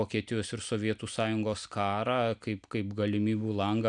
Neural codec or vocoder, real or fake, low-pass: none; real; 9.9 kHz